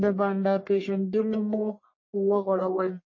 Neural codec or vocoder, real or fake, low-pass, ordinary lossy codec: codec, 44.1 kHz, 1.7 kbps, Pupu-Codec; fake; 7.2 kHz; MP3, 32 kbps